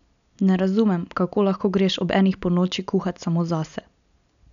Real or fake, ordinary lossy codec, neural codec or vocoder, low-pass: real; none; none; 7.2 kHz